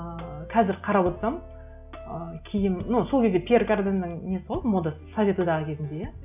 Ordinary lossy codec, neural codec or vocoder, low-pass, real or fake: none; none; 3.6 kHz; real